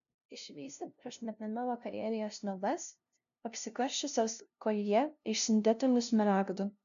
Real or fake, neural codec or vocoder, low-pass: fake; codec, 16 kHz, 0.5 kbps, FunCodec, trained on LibriTTS, 25 frames a second; 7.2 kHz